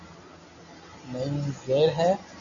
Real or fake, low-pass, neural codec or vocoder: real; 7.2 kHz; none